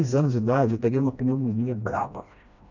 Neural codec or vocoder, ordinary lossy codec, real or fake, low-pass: codec, 16 kHz, 1 kbps, FreqCodec, smaller model; none; fake; 7.2 kHz